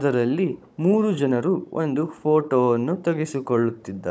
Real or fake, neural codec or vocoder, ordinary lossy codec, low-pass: fake; codec, 16 kHz, 16 kbps, FunCodec, trained on Chinese and English, 50 frames a second; none; none